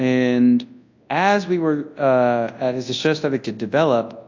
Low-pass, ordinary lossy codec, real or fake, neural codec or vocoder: 7.2 kHz; AAC, 48 kbps; fake; codec, 24 kHz, 0.9 kbps, WavTokenizer, large speech release